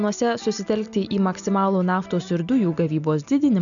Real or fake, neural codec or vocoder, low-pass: real; none; 7.2 kHz